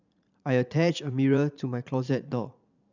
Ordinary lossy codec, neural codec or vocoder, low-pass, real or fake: none; vocoder, 44.1 kHz, 80 mel bands, Vocos; 7.2 kHz; fake